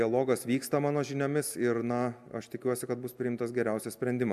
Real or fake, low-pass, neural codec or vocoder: real; 14.4 kHz; none